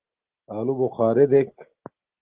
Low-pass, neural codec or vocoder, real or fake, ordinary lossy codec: 3.6 kHz; none; real; Opus, 16 kbps